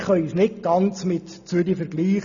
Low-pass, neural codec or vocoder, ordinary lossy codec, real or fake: 7.2 kHz; none; none; real